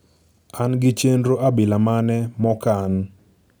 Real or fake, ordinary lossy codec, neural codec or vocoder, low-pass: real; none; none; none